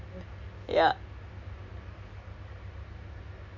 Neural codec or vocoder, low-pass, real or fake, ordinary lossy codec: none; 7.2 kHz; real; none